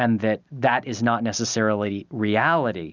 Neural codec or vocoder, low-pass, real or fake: none; 7.2 kHz; real